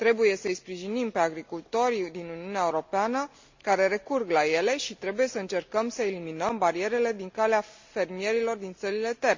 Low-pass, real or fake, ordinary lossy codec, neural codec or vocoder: 7.2 kHz; real; MP3, 64 kbps; none